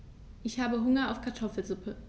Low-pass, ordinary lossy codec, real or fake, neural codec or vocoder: none; none; real; none